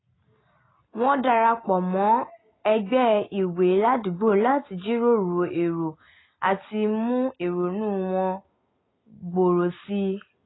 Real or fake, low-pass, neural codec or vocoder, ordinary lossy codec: real; 7.2 kHz; none; AAC, 16 kbps